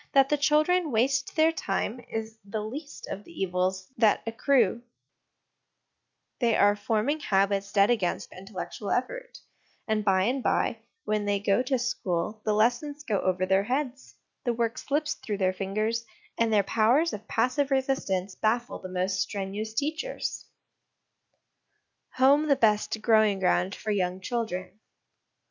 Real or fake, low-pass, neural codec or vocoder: real; 7.2 kHz; none